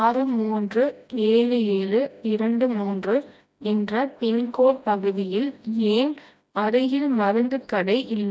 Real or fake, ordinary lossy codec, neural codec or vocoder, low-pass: fake; none; codec, 16 kHz, 1 kbps, FreqCodec, smaller model; none